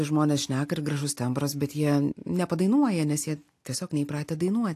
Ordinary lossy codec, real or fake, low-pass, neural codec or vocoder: AAC, 64 kbps; real; 14.4 kHz; none